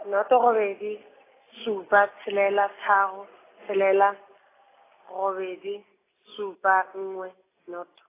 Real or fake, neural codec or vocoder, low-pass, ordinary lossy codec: real; none; 3.6 kHz; AAC, 16 kbps